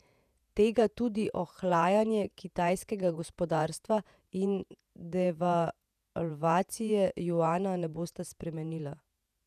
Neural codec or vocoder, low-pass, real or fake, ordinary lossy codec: vocoder, 48 kHz, 128 mel bands, Vocos; 14.4 kHz; fake; none